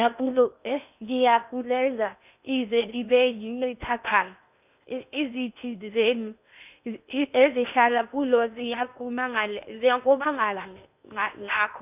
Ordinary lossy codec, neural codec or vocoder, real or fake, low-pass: none; codec, 16 kHz in and 24 kHz out, 0.8 kbps, FocalCodec, streaming, 65536 codes; fake; 3.6 kHz